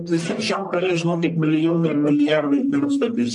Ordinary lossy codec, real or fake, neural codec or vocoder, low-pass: MP3, 96 kbps; fake; codec, 44.1 kHz, 1.7 kbps, Pupu-Codec; 10.8 kHz